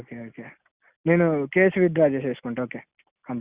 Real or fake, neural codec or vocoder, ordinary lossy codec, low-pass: real; none; Opus, 64 kbps; 3.6 kHz